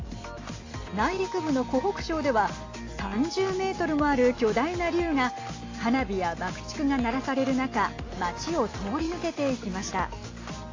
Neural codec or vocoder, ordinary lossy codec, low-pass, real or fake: none; AAC, 32 kbps; 7.2 kHz; real